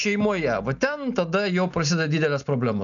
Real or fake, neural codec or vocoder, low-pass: real; none; 7.2 kHz